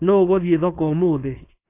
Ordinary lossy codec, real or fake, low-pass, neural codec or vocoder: AAC, 24 kbps; fake; 3.6 kHz; codec, 16 kHz, 4.8 kbps, FACodec